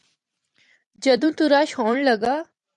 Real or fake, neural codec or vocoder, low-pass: fake; vocoder, 44.1 kHz, 128 mel bands every 256 samples, BigVGAN v2; 10.8 kHz